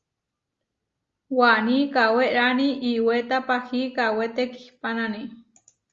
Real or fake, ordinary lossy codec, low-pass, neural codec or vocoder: real; Opus, 32 kbps; 7.2 kHz; none